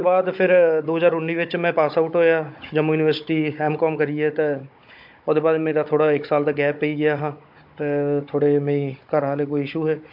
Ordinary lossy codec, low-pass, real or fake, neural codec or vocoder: MP3, 48 kbps; 5.4 kHz; fake; codec, 16 kHz, 16 kbps, FunCodec, trained on Chinese and English, 50 frames a second